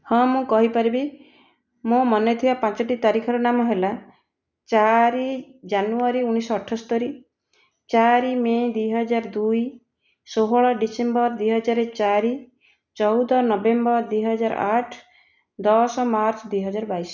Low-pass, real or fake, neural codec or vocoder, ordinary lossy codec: 7.2 kHz; real; none; none